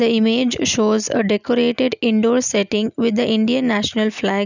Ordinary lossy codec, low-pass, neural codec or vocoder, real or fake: none; 7.2 kHz; none; real